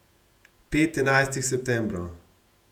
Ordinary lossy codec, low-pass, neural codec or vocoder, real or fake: none; 19.8 kHz; vocoder, 48 kHz, 128 mel bands, Vocos; fake